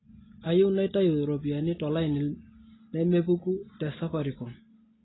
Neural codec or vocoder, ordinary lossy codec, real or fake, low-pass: none; AAC, 16 kbps; real; 7.2 kHz